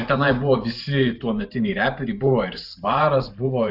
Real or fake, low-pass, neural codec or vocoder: fake; 5.4 kHz; vocoder, 44.1 kHz, 128 mel bands every 512 samples, BigVGAN v2